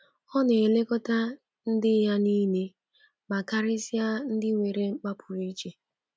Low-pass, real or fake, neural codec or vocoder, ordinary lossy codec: none; real; none; none